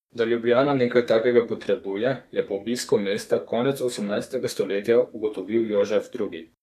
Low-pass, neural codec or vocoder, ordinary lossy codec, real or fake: 14.4 kHz; codec, 32 kHz, 1.9 kbps, SNAC; none; fake